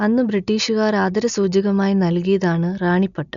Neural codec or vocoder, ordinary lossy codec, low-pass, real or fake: none; none; 7.2 kHz; real